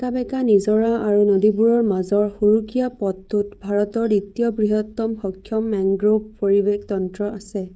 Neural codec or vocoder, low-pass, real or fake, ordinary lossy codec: codec, 16 kHz, 16 kbps, FreqCodec, smaller model; none; fake; none